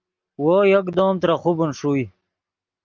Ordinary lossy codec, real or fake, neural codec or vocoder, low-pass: Opus, 32 kbps; real; none; 7.2 kHz